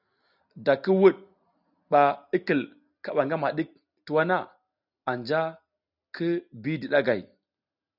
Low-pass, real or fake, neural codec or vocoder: 5.4 kHz; real; none